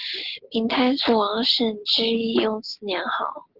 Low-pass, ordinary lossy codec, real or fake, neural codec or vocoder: 5.4 kHz; Opus, 16 kbps; real; none